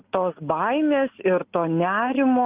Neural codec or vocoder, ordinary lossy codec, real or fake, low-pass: none; Opus, 32 kbps; real; 3.6 kHz